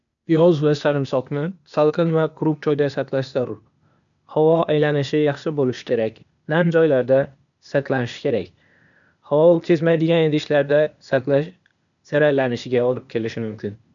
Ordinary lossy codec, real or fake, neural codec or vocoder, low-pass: none; fake; codec, 16 kHz, 0.8 kbps, ZipCodec; 7.2 kHz